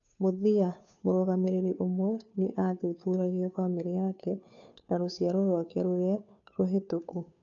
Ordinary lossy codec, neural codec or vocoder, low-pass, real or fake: none; codec, 16 kHz, 2 kbps, FunCodec, trained on Chinese and English, 25 frames a second; 7.2 kHz; fake